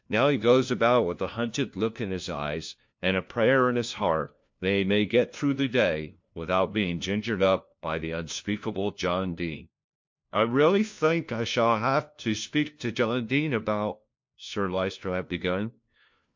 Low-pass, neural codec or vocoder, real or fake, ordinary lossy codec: 7.2 kHz; codec, 16 kHz, 1 kbps, FunCodec, trained on LibriTTS, 50 frames a second; fake; MP3, 48 kbps